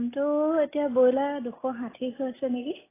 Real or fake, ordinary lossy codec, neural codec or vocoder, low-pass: real; AAC, 16 kbps; none; 3.6 kHz